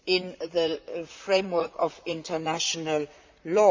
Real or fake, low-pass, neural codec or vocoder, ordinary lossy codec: fake; 7.2 kHz; vocoder, 44.1 kHz, 128 mel bands, Pupu-Vocoder; MP3, 64 kbps